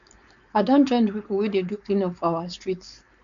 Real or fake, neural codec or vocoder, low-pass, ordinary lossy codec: fake; codec, 16 kHz, 4.8 kbps, FACodec; 7.2 kHz; none